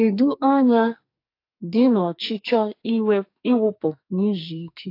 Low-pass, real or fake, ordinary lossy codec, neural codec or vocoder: 5.4 kHz; fake; AAC, 32 kbps; codec, 44.1 kHz, 2.6 kbps, SNAC